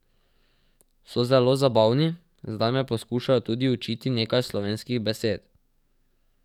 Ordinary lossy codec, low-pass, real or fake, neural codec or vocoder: none; 19.8 kHz; fake; codec, 44.1 kHz, 7.8 kbps, DAC